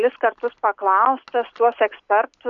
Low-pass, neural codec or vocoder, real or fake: 7.2 kHz; none; real